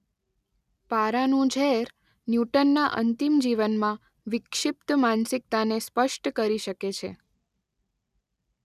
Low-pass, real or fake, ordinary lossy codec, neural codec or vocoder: 14.4 kHz; real; none; none